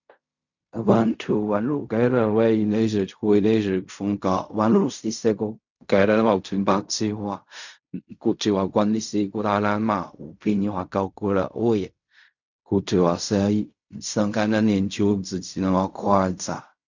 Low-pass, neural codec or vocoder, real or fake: 7.2 kHz; codec, 16 kHz in and 24 kHz out, 0.4 kbps, LongCat-Audio-Codec, fine tuned four codebook decoder; fake